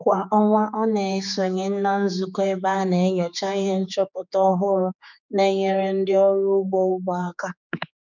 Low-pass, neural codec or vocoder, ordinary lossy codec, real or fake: 7.2 kHz; codec, 16 kHz, 4 kbps, X-Codec, HuBERT features, trained on general audio; none; fake